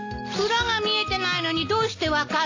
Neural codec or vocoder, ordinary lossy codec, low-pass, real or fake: none; AAC, 48 kbps; 7.2 kHz; real